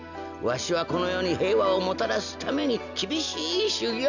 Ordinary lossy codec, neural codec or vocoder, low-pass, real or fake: none; none; 7.2 kHz; real